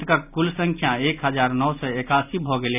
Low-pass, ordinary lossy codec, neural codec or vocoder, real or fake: 3.6 kHz; none; none; real